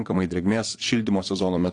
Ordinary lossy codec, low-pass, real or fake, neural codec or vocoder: AAC, 48 kbps; 9.9 kHz; fake; vocoder, 22.05 kHz, 80 mel bands, WaveNeXt